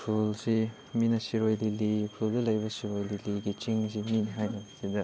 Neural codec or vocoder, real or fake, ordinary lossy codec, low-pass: none; real; none; none